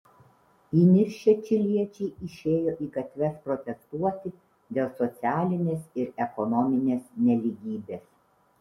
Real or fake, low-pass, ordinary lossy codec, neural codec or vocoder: real; 19.8 kHz; MP3, 64 kbps; none